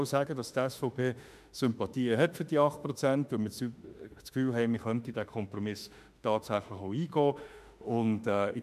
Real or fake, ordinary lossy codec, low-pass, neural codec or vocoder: fake; none; 14.4 kHz; autoencoder, 48 kHz, 32 numbers a frame, DAC-VAE, trained on Japanese speech